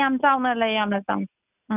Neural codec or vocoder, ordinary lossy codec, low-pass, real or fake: none; none; 3.6 kHz; real